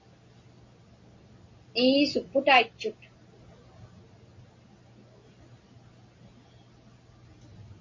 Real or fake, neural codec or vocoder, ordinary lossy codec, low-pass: real; none; MP3, 32 kbps; 7.2 kHz